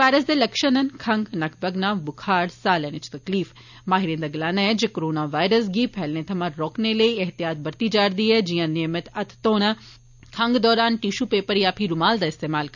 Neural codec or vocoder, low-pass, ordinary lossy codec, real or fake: none; 7.2 kHz; none; real